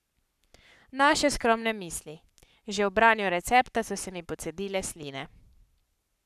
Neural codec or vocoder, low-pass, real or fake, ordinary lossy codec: codec, 44.1 kHz, 7.8 kbps, Pupu-Codec; 14.4 kHz; fake; none